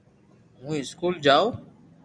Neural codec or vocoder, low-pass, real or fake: none; 9.9 kHz; real